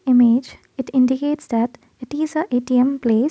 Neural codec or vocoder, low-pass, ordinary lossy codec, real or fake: none; none; none; real